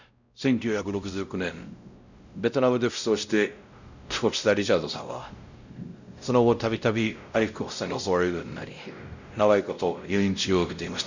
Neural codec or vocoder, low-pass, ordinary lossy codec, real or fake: codec, 16 kHz, 0.5 kbps, X-Codec, WavLM features, trained on Multilingual LibriSpeech; 7.2 kHz; none; fake